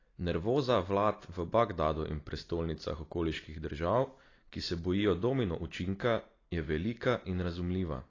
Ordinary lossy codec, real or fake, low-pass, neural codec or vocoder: AAC, 32 kbps; real; 7.2 kHz; none